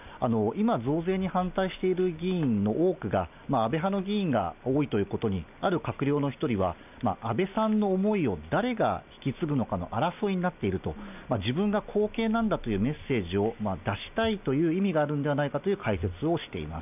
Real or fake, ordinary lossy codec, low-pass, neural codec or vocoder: real; none; 3.6 kHz; none